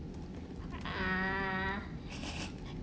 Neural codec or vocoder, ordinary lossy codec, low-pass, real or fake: none; none; none; real